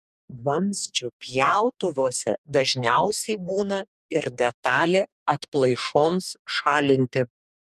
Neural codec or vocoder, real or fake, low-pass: codec, 44.1 kHz, 2.6 kbps, DAC; fake; 14.4 kHz